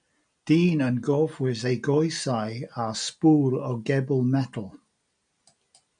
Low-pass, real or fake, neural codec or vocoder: 9.9 kHz; real; none